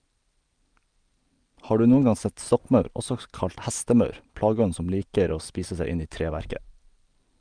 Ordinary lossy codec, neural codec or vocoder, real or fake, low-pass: Opus, 32 kbps; none; real; 9.9 kHz